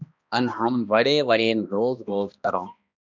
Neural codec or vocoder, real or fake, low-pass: codec, 16 kHz, 1 kbps, X-Codec, HuBERT features, trained on balanced general audio; fake; 7.2 kHz